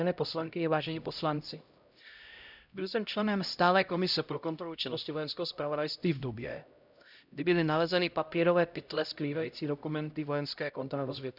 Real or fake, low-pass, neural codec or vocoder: fake; 5.4 kHz; codec, 16 kHz, 0.5 kbps, X-Codec, HuBERT features, trained on LibriSpeech